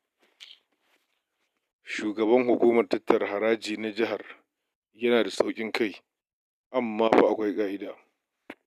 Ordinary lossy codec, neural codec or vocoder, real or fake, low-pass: none; none; real; 14.4 kHz